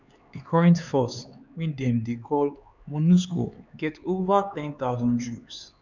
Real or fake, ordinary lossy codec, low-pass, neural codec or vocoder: fake; none; 7.2 kHz; codec, 16 kHz, 4 kbps, X-Codec, HuBERT features, trained on LibriSpeech